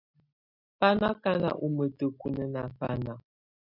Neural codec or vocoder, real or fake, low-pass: none; real; 5.4 kHz